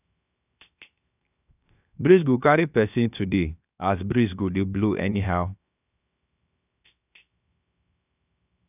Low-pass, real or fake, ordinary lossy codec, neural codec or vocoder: 3.6 kHz; fake; none; codec, 16 kHz, 0.7 kbps, FocalCodec